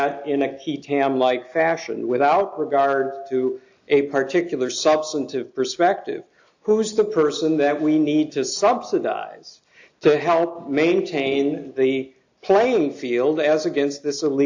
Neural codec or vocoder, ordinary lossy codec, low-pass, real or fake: none; AAC, 48 kbps; 7.2 kHz; real